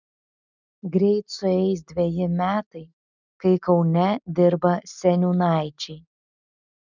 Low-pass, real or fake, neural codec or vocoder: 7.2 kHz; real; none